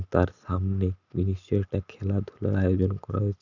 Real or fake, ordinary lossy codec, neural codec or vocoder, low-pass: real; none; none; 7.2 kHz